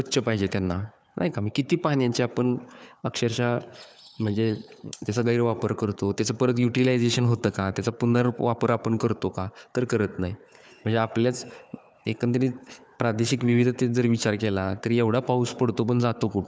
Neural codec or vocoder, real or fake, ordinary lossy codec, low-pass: codec, 16 kHz, 4 kbps, FunCodec, trained on Chinese and English, 50 frames a second; fake; none; none